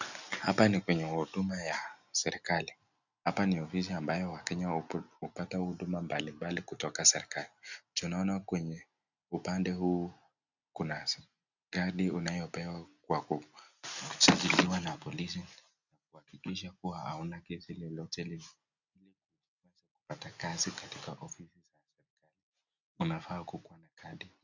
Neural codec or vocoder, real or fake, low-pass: none; real; 7.2 kHz